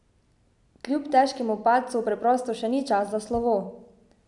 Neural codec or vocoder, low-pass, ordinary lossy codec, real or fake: none; 10.8 kHz; none; real